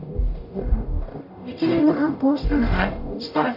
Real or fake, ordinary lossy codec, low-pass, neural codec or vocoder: fake; none; 5.4 kHz; codec, 44.1 kHz, 0.9 kbps, DAC